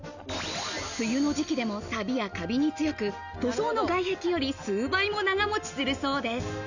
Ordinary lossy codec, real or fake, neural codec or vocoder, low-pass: none; real; none; 7.2 kHz